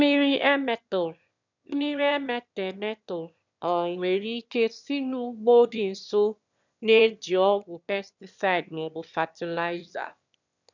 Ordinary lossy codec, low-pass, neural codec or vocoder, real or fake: none; 7.2 kHz; autoencoder, 22.05 kHz, a latent of 192 numbers a frame, VITS, trained on one speaker; fake